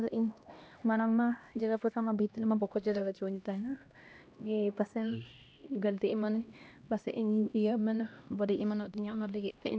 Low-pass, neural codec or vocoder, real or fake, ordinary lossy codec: none; codec, 16 kHz, 1 kbps, X-Codec, HuBERT features, trained on LibriSpeech; fake; none